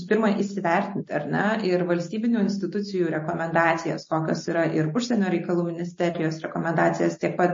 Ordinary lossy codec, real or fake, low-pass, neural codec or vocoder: MP3, 32 kbps; real; 7.2 kHz; none